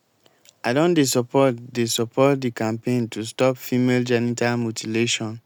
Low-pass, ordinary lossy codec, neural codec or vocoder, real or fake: none; none; none; real